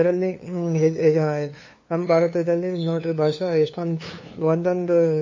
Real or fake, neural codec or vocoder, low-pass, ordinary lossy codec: fake; codec, 16 kHz, 2 kbps, FunCodec, trained on LibriTTS, 25 frames a second; 7.2 kHz; MP3, 32 kbps